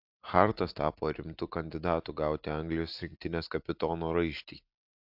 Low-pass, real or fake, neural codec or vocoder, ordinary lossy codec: 5.4 kHz; real; none; AAC, 48 kbps